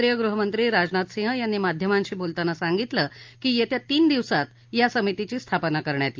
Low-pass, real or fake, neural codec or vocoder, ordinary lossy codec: 7.2 kHz; real; none; Opus, 24 kbps